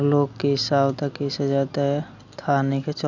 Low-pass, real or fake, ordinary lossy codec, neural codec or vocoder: 7.2 kHz; real; none; none